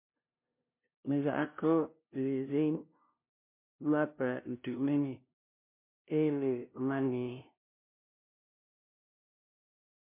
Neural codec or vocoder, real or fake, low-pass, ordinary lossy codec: codec, 16 kHz, 0.5 kbps, FunCodec, trained on LibriTTS, 25 frames a second; fake; 3.6 kHz; MP3, 24 kbps